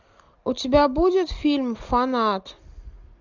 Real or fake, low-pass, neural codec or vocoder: real; 7.2 kHz; none